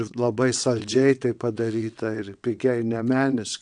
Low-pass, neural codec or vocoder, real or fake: 9.9 kHz; vocoder, 22.05 kHz, 80 mel bands, WaveNeXt; fake